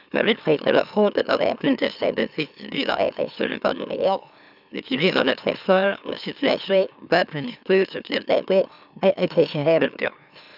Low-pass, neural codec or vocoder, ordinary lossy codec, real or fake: 5.4 kHz; autoencoder, 44.1 kHz, a latent of 192 numbers a frame, MeloTTS; none; fake